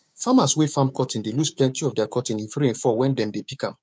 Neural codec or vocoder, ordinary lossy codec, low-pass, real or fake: codec, 16 kHz, 6 kbps, DAC; none; none; fake